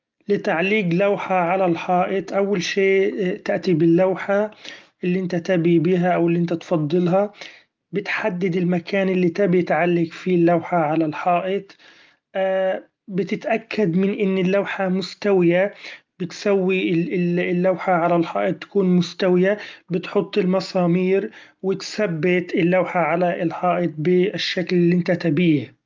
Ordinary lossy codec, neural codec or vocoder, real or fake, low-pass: Opus, 24 kbps; none; real; 7.2 kHz